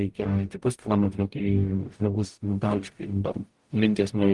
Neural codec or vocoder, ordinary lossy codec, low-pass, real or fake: codec, 44.1 kHz, 0.9 kbps, DAC; Opus, 24 kbps; 10.8 kHz; fake